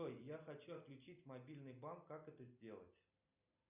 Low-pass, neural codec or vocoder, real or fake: 3.6 kHz; none; real